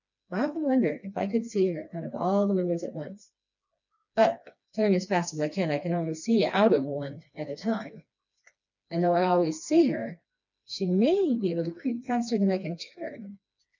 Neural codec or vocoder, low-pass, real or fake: codec, 16 kHz, 2 kbps, FreqCodec, smaller model; 7.2 kHz; fake